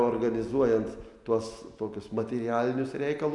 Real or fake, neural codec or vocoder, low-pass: real; none; 10.8 kHz